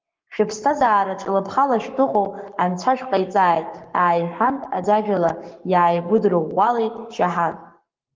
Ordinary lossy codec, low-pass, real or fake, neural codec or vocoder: Opus, 32 kbps; 7.2 kHz; fake; codec, 44.1 kHz, 7.8 kbps, Pupu-Codec